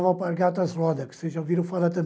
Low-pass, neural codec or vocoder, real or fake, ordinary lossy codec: none; none; real; none